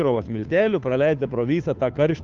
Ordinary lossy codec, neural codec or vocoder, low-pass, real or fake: Opus, 24 kbps; codec, 16 kHz, 2 kbps, FunCodec, trained on Chinese and English, 25 frames a second; 7.2 kHz; fake